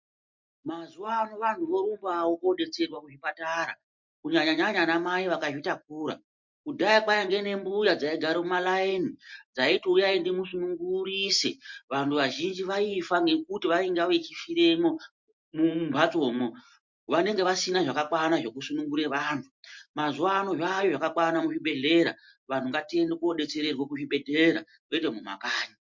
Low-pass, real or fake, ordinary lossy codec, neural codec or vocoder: 7.2 kHz; real; MP3, 48 kbps; none